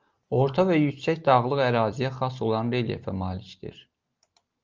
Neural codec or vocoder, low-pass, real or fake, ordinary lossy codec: none; 7.2 kHz; real; Opus, 24 kbps